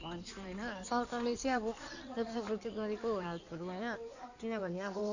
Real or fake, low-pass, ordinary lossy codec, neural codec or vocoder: fake; 7.2 kHz; none; codec, 16 kHz in and 24 kHz out, 2.2 kbps, FireRedTTS-2 codec